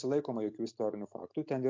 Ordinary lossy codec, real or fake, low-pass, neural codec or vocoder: MP3, 48 kbps; real; 7.2 kHz; none